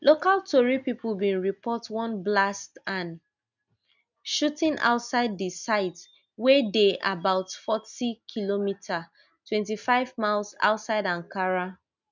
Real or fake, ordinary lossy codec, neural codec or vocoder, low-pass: real; none; none; 7.2 kHz